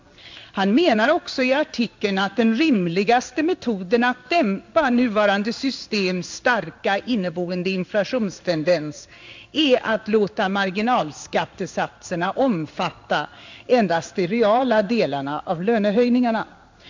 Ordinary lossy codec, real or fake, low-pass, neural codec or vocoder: MP3, 64 kbps; fake; 7.2 kHz; codec, 16 kHz in and 24 kHz out, 1 kbps, XY-Tokenizer